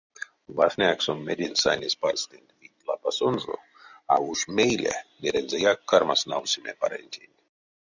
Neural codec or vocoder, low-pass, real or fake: none; 7.2 kHz; real